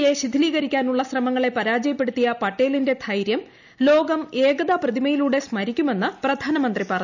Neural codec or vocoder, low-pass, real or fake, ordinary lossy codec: none; 7.2 kHz; real; none